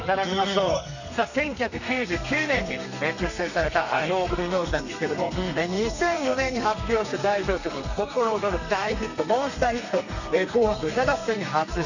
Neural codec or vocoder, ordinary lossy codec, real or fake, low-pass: codec, 32 kHz, 1.9 kbps, SNAC; none; fake; 7.2 kHz